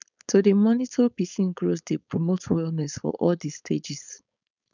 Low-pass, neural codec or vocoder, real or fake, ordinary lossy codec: 7.2 kHz; codec, 16 kHz, 4.8 kbps, FACodec; fake; none